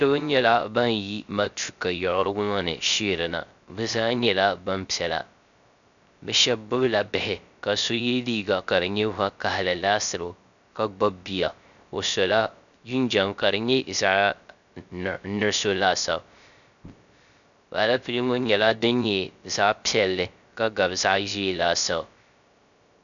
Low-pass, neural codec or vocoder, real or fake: 7.2 kHz; codec, 16 kHz, 0.3 kbps, FocalCodec; fake